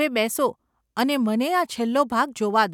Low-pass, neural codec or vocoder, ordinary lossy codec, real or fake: 19.8 kHz; none; none; real